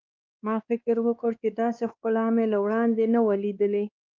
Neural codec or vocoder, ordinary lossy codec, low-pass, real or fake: codec, 16 kHz, 2 kbps, X-Codec, WavLM features, trained on Multilingual LibriSpeech; Opus, 24 kbps; 7.2 kHz; fake